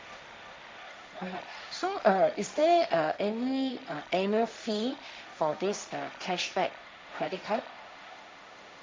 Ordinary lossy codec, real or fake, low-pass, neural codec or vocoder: none; fake; none; codec, 16 kHz, 1.1 kbps, Voila-Tokenizer